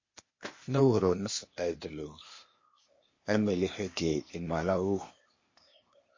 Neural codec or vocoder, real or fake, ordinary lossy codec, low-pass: codec, 16 kHz, 0.8 kbps, ZipCodec; fake; MP3, 32 kbps; 7.2 kHz